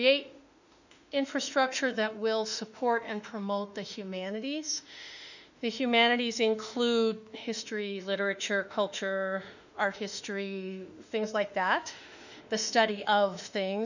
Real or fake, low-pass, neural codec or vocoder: fake; 7.2 kHz; autoencoder, 48 kHz, 32 numbers a frame, DAC-VAE, trained on Japanese speech